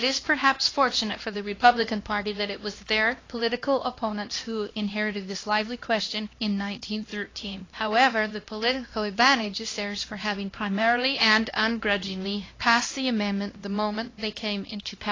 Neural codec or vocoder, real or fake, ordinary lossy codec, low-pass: codec, 16 kHz, 1 kbps, X-Codec, HuBERT features, trained on LibriSpeech; fake; AAC, 32 kbps; 7.2 kHz